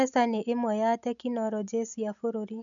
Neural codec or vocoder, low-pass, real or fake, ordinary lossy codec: none; 7.2 kHz; real; none